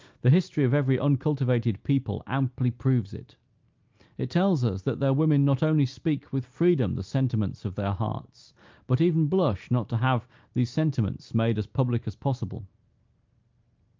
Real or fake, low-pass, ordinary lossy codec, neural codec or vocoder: real; 7.2 kHz; Opus, 24 kbps; none